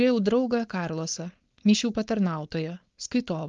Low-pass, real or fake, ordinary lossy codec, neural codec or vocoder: 7.2 kHz; fake; Opus, 32 kbps; codec, 16 kHz, 4.8 kbps, FACodec